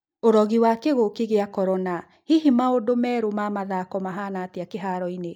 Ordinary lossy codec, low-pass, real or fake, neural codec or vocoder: none; 19.8 kHz; real; none